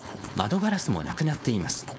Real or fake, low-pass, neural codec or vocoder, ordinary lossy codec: fake; none; codec, 16 kHz, 4.8 kbps, FACodec; none